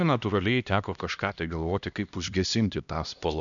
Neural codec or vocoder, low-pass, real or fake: codec, 16 kHz, 1 kbps, X-Codec, HuBERT features, trained on LibriSpeech; 7.2 kHz; fake